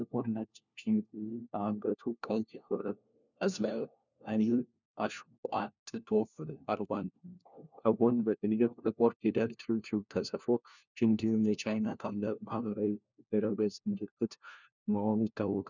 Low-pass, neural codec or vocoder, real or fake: 7.2 kHz; codec, 16 kHz, 1 kbps, FunCodec, trained on LibriTTS, 50 frames a second; fake